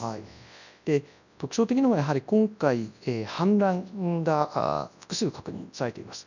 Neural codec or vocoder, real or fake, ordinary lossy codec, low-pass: codec, 24 kHz, 0.9 kbps, WavTokenizer, large speech release; fake; none; 7.2 kHz